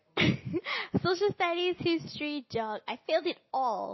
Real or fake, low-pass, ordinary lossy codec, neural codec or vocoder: real; 7.2 kHz; MP3, 24 kbps; none